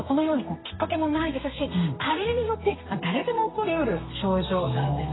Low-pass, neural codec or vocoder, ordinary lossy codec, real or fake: 7.2 kHz; codec, 32 kHz, 1.9 kbps, SNAC; AAC, 16 kbps; fake